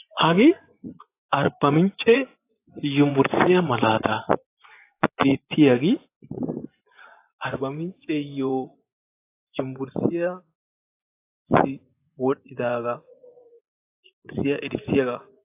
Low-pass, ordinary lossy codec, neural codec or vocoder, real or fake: 3.6 kHz; AAC, 24 kbps; vocoder, 24 kHz, 100 mel bands, Vocos; fake